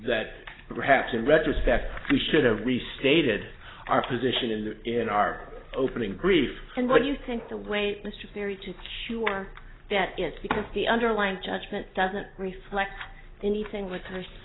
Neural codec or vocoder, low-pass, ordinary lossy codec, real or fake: none; 7.2 kHz; AAC, 16 kbps; real